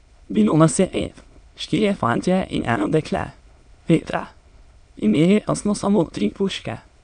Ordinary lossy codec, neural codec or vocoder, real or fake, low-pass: MP3, 96 kbps; autoencoder, 22.05 kHz, a latent of 192 numbers a frame, VITS, trained on many speakers; fake; 9.9 kHz